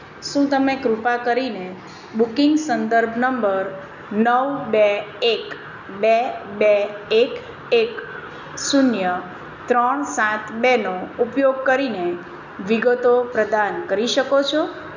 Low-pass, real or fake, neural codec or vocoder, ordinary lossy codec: 7.2 kHz; real; none; none